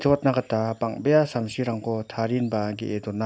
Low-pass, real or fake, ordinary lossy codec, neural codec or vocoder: none; real; none; none